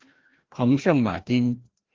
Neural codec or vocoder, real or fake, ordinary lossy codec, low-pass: codec, 16 kHz, 1 kbps, FreqCodec, larger model; fake; Opus, 16 kbps; 7.2 kHz